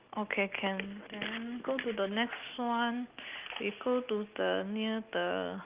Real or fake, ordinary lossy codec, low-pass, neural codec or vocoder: real; Opus, 32 kbps; 3.6 kHz; none